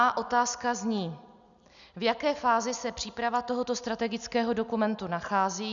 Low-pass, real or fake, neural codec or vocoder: 7.2 kHz; real; none